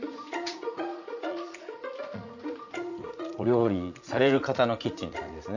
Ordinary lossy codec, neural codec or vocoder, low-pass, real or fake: none; vocoder, 44.1 kHz, 80 mel bands, Vocos; 7.2 kHz; fake